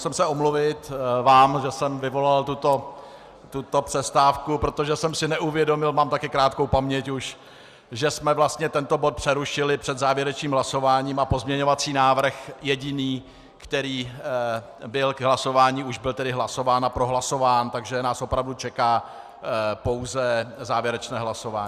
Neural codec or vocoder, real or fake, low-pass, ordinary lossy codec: none; real; 14.4 kHz; Opus, 64 kbps